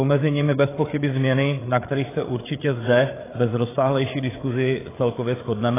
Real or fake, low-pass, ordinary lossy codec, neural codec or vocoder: fake; 3.6 kHz; AAC, 16 kbps; codec, 16 kHz, 8 kbps, FreqCodec, larger model